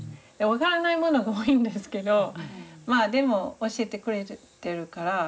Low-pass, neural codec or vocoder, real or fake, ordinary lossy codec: none; none; real; none